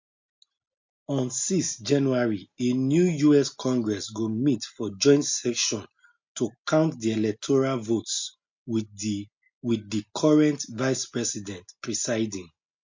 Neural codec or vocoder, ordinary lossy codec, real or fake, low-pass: none; MP3, 48 kbps; real; 7.2 kHz